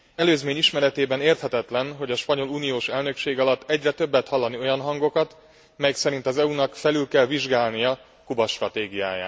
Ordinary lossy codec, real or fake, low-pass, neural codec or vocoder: none; real; none; none